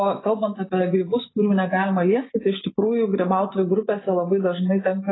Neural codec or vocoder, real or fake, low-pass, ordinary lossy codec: none; real; 7.2 kHz; AAC, 16 kbps